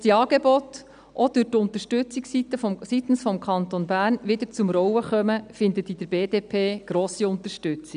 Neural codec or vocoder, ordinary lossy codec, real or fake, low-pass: none; none; real; 9.9 kHz